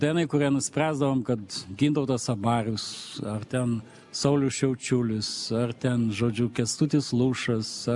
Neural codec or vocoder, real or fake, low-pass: none; real; 10.8 kHz